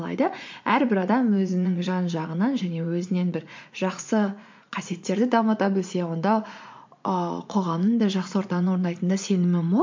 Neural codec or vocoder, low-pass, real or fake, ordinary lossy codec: vocoder, 44.1 kHz, 80 mel bands, Vocos; 7.2 kHz; fake; MP3, 48 kbps